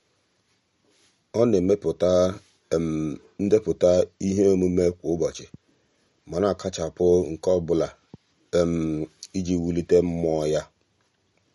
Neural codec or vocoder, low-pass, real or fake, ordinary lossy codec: none; 19.8 kHz; real; MP3, 48 kbps